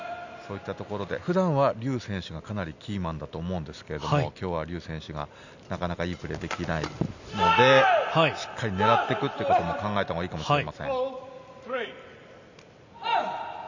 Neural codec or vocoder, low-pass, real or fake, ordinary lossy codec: none; 7.2 kHz; real; none